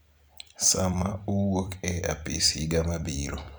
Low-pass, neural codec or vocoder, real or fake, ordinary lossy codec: none; vocoder, 44.1 kHz, 128 mel bands every 256 samples, BigVGAN v2; fake; none